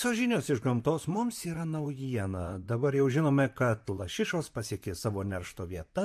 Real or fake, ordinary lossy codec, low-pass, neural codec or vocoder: fake; MP3, 64 kbps; 14.4 kHz; vocoder, 44.1 kHz, 128 mel bands, Pupu-Vocoder